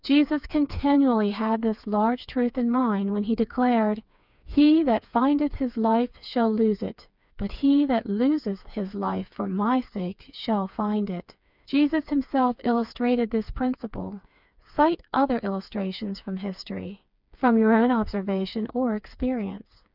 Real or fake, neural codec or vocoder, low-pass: fake; codec, 16 kHz, 4 kbps, FreqCodec, smaller model; 5.4 kHz